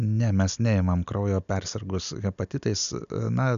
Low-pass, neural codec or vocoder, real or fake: 7.2 kHz; none; real